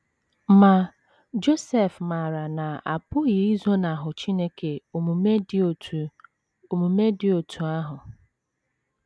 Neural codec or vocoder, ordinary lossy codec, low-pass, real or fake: none; none; none; real